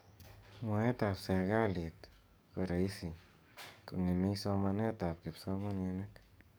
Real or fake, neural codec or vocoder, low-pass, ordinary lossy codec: fake; codec, 44.1 kHz, 7.8 kbps, DAC; none; none